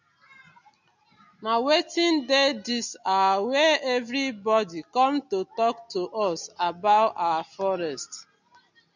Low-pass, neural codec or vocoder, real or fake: 7.2 kHz; none; real